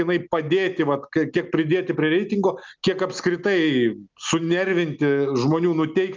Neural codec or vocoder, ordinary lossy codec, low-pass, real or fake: none; Opus, 32 kbps; 7.2 kHz; real